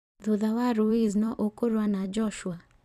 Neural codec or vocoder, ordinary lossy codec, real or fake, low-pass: vocoder, 44.1 kHz, 128 mel bands every 256 samples, BigVGAN v2; none; fake; 14.4 kHz